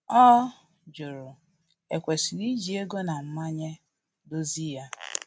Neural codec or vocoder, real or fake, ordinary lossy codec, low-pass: none; real; none; none